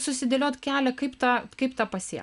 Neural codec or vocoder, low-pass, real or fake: none; 10.8 kHz; real